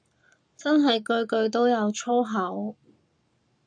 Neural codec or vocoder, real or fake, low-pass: codec, 44.1 kHz, 7.8 kbps, Pupu-Codec; fake; 9.9 kHz